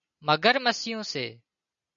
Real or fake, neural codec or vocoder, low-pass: real; none; 7.2 kHz